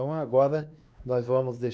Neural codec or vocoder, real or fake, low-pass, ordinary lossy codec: codec, 16 kHz, 2 kbps, X-Codec, WavLM features, trained on Multilingual LibriSpeech; fake; none; none